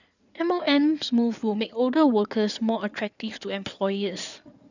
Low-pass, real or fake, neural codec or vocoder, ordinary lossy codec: 7.2 kHz; fake; codec, 16 kHz in and 24 kHz out, 2.2 kbps, FireRedTTS-2 codec; none